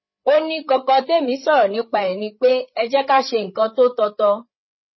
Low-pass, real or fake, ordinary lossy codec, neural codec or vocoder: 7.2 kHz; fake; MP3, 24 kbps; codec, 16 kHz, 8 kbps, FreqCodec, larger model